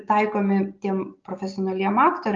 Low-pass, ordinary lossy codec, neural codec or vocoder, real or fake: 7.2 kHz; Opus, 24 kbps; none; real